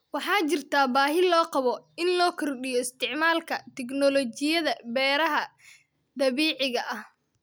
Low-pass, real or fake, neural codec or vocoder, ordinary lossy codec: none; real; none; none